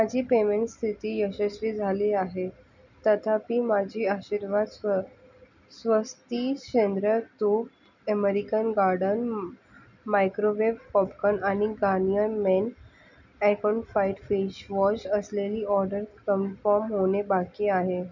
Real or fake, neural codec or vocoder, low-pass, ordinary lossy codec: real; none; 7.2 kHz; none